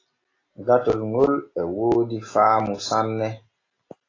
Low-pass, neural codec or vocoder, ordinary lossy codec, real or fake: 7.2 kHz; none; AAC, 32 kbps; real